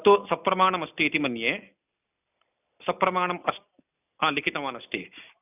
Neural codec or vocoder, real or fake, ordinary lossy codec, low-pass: none; real; none; 3.6 kHz